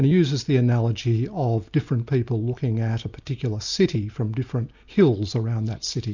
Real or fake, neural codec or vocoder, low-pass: real; none; 7.2 kHz